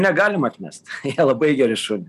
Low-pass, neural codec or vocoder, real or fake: 14.4 kHz; none; real